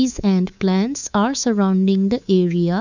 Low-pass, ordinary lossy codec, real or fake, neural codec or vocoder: 7.2 kHz; none; fake; codec, 24 kHz, 3.1 kbps, DualCodec